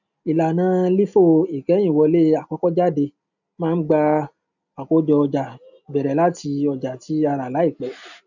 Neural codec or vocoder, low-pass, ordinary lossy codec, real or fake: none; 7.2 kHz; none; real